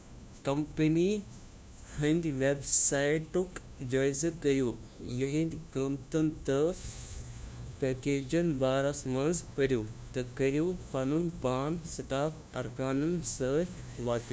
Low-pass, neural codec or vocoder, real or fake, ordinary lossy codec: none; codec, 16 kHz, 1 kbps, FunCodec, trained on LibriTTS, 50 frames a second; fake; none